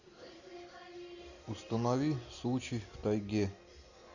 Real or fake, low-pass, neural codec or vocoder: real; 7.2 kHz; none